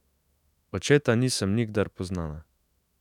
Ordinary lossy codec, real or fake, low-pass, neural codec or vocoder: none; fake; 19.8 kHz; autoencoder, 48 kHz, 128 numbers a frame, DAC-VAE, trained on Japanese speech